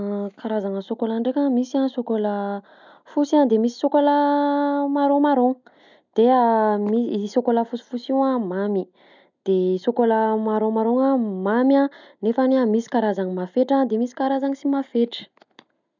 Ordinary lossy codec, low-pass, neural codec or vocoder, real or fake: none; 7.2 kHz; none; real